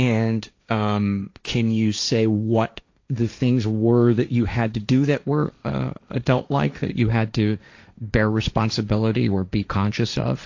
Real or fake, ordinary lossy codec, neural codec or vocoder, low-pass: fake; AAC, 48 kbps; codec, 16 kHz, 1.1 kbps, Voila-Tokenizer; 7.2 kHz